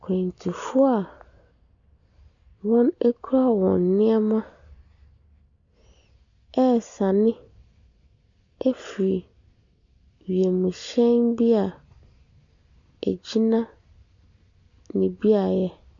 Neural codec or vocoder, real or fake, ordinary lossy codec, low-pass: none; real; AAC, 64 kbps; 7.2 kHz